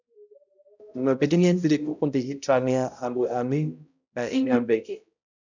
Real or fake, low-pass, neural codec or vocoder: fake; 7.2 kHz; codec, 16 kHz, 0.5 kbps, X-Codec, HuBERT features, trained on balanced general audio